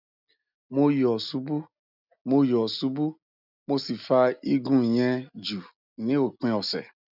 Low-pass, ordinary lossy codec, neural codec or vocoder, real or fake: 5.4 kHz; none; none; real